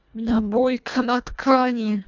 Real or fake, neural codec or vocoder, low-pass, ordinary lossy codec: fake; codec, 24 kHz, 1.5 kbps, HILCodec; 7.2 kHz; none